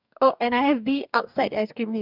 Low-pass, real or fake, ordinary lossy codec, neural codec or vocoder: 5.4 kHz; fake; none; codec, 44.1 kHz, 2.6 kbps, DAC